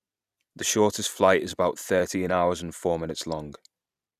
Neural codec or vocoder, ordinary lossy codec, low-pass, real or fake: vocoder, 44.1 kHz, 128 mel bands every 256 samples, BigVGAN v2; none; 14.4 kHz; fake